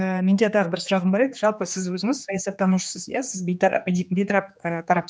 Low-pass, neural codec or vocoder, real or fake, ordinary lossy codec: none; codec, 16 kHz, 2 kbps, X-Codec, HuBERT features, trained on general audio; fake; none